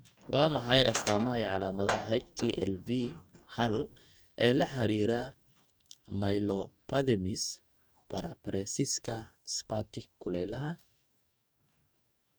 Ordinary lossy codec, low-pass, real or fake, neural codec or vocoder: none; none; fake; codec, 44.1 kHz, 2.6 kbps, DAC